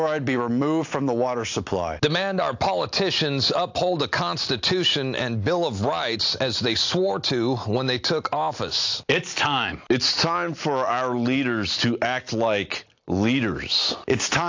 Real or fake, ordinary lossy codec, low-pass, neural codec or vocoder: real; AAC, 48 kbps; 7.2 kHz; none